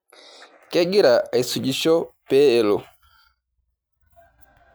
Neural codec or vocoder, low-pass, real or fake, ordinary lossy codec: none; none; real; none